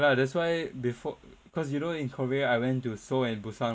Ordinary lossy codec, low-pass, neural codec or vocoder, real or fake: none; none; none; real